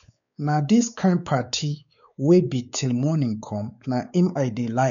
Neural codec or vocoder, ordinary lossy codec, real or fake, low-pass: codec, 16 kHz, 4 kbps, X-Codec, WavLM features, trained on Multilingual LibriSpeech; none; fake; 7.2 kHz